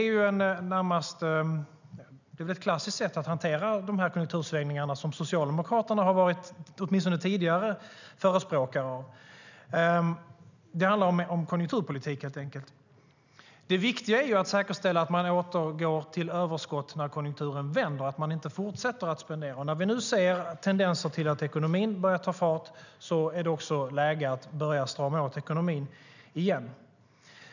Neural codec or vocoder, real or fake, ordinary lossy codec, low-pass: none; real; none; 7.2 kHz